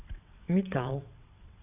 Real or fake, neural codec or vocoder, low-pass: fake; vocoder, 22.05 kHz, 80 mel bands, WaveNeXt; 3.6 kHz